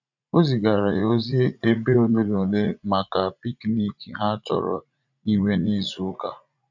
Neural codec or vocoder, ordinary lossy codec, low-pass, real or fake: vocoder, 44.1 kHz, 80 mel bands, Vocos; none; 7.2 kHz; fake